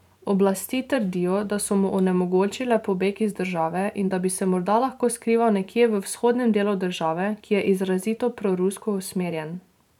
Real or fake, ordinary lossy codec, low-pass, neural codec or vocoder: fake; none; 19.8 kHz; vocoder, 44.1 kHz, 128 mel bands every 512 samples, BigVGAN v2